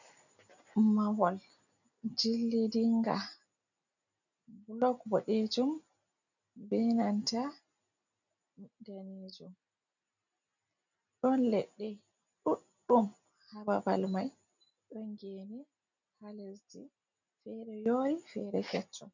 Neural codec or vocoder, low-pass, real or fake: none; 7.2 kHz; real